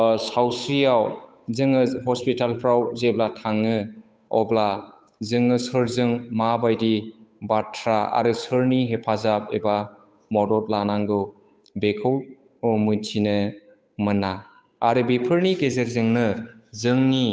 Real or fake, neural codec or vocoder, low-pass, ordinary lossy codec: fake; codec, 16 kHz, 8 kbps, FunCodec, trained on Chinese and English, 25 frames a second; none; none